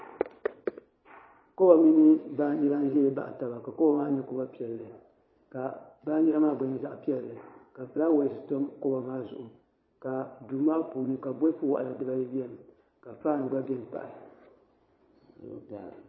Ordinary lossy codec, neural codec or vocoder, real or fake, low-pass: MP3, 24 kbps; vocoder, 22.05 kHz, 80 mel bands, Vocos; fake; 7.2 kHz